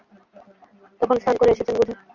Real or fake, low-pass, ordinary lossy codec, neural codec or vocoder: real; 7.2 kHz; Opus, 64 kbps; none